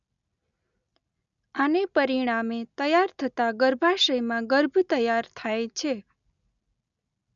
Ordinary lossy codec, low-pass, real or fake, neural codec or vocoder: none; 7.2 kHz; real; none